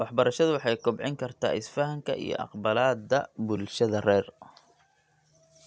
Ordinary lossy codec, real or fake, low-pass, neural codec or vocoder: none; real; none; none